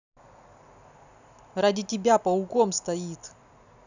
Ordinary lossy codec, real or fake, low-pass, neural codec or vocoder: none; real; 7.2 kHz; none